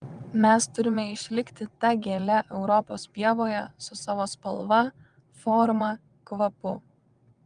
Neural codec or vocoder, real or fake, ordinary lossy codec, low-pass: vocoder, 22.05 kHz, 80 mel bands, WaveNeXt; fake; Opus, 32 kbps; 9.9 kHz